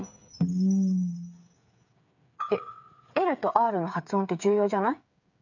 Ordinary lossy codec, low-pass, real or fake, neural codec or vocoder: none; 7.2 kHz; fake; codec, 16 kHz, 8 kbps, FreqCodec, smaller model